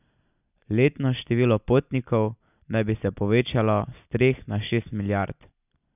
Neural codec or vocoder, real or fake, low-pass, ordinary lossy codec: none; real; 3.6 kHz; none